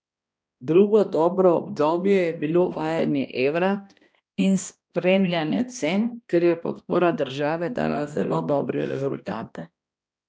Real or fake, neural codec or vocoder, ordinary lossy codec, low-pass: fake; codec, 16 kHz, 1 kbps, X-Codec, HuBERT features, trained on balanced general audio; none; none